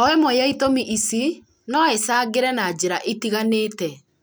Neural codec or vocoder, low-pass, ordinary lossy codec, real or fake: none; none; none; real